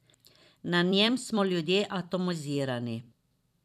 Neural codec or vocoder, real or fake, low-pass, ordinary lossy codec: vocoder, 44.1 kHz, 128 mel bands every 256 samples, BigVGAN v2; fake; 14.4 kHz; none